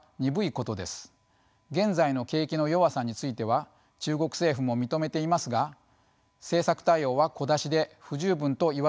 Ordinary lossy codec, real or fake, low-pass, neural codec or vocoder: none; real; none; none